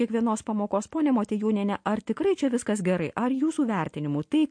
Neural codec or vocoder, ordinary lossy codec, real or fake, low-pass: none; MP3, 48 kbps; real; 9.9 kHz